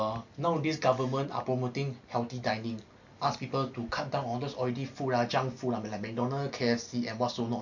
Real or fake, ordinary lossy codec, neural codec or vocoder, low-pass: real; MP3, 48 kbps; none; 7.2 kHz